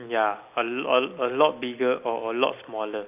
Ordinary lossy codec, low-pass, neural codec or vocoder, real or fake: none; 3.6 kHz; none; real